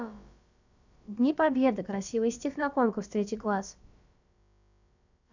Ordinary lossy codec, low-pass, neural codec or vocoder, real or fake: none; 7.2 kHz; codec, 16 kHz, about 1 kbps, DyCAST, with the encoder's durations; fake